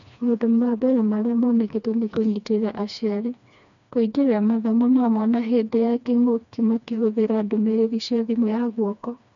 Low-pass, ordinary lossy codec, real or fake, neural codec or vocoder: 7.2 kHz; none; fake; codec, 16 kHz, 2 kbps, FreqCodec, smaller model